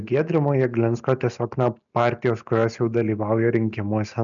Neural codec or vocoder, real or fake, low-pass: none; real; 7.2 kHz